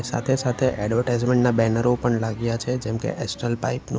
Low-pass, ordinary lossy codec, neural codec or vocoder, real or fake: none; none; none; real